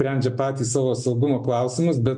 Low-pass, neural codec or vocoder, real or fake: 10.8 kHz; none; real